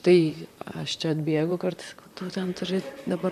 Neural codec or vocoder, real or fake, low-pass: vocoder, 44.1 kHz, 128 mel bands, Pupu-Vocoder; fake; 14.4 kHz